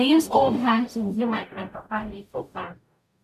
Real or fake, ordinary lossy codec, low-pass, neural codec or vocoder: fake; MP3, 96 kbps; 14.4 kHz; codec, 44.1 kHz, 0.9 kbps, DAC